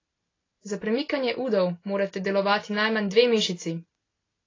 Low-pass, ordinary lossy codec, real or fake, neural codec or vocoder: 7.2 kHz; AAC, 32 kbps; real; none